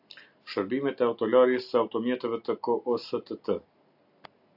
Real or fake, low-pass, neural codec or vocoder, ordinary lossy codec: real; 5.4 kHz; none; AAC, 48 kbps